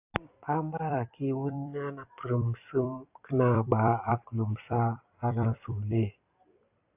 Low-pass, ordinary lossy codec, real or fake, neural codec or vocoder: 3.6 kHz; AAC, 32 kbps; fake; vocoder, 44.1 kHz, 128 mel bands every 256 samples, BigVGAN v2